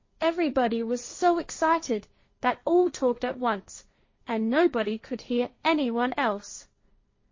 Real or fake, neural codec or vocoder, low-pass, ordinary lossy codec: fake; codec, 16 kHz, 1.1 kbps, Voila-Tokenizer; 7.2 kHz; MP3, 32 kbps